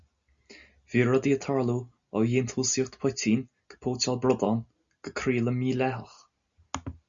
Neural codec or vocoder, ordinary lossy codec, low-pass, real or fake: none; Opus, 64 kbps; 7.2 kHz; real